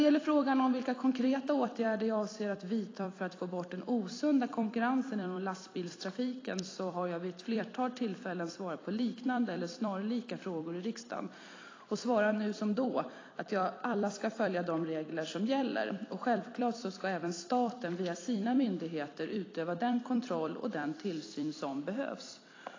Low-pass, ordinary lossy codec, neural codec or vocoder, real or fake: 7.2 kHz; AAC, 32 kbps; vocoder, 44.1 kHz, 128 mel bands every 256 samples, BigVGAN v2; fake